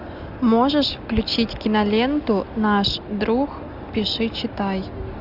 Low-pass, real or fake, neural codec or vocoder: 5.4 kHz; real; none